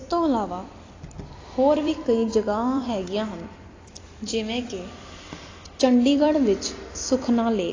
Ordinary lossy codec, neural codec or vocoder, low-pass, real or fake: AAC, 32 kbps; vocoder, 44.1 kHz, 128 mel bands every 256 samples, BigVGAN v2; 7.2 kHz; fake